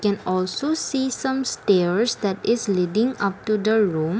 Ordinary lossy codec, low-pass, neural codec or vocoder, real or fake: none; none; none; real